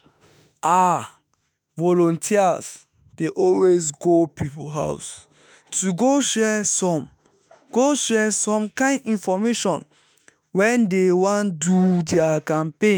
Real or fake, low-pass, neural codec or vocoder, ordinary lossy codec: fake; none; autoencoder, 48 kHz, 32 numbers a frame, DAC-VAE, trained on Japanese speech; none